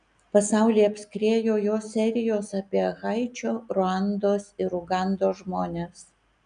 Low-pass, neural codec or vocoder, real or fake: 9.9 kHz; none; real